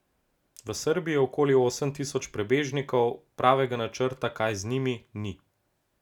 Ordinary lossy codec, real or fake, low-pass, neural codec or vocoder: none; real; 19.8 kHz; none